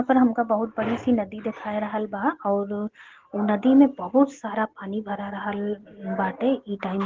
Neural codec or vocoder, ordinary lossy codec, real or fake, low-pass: none; Opus, 16 kbps; real; 7.2 kHz